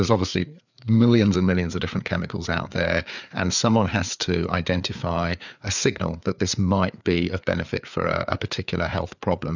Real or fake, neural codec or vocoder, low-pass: fake; codec, 16 kHz, 4 kbps, FreqCodec, larger model; 7.2 kHz